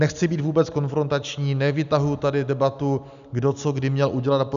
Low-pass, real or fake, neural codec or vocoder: 7.2 kHz; real; none